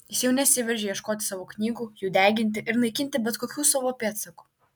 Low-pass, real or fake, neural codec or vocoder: 19.8 kHz; real; none